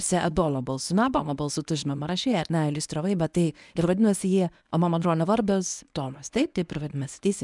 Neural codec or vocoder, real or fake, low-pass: codec, 24 kHz, 0.9 kbps, WavTokenizer, medium speech release version 1; fake; 10.8 kHz